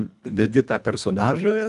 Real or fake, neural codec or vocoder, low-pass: fake; codec, 24 kHz, 1.5 kbps, HILCodec; 10.8 kHz